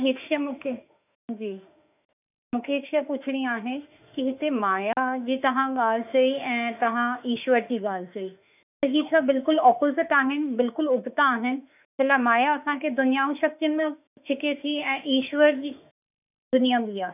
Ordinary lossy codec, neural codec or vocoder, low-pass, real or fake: none; autoencoder, 48 kHz, 32 numbers a frame, DAC-VAE, trained on Japanese speech; 3.6 kHz; fake